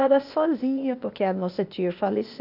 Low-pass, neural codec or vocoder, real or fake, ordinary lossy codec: 5.4 kHz; codec, 16 kHz, 0.8 kbps, ZipCodec; fake; none